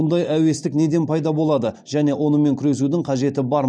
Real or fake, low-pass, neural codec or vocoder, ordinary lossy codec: real; 9.9 kHz; none; none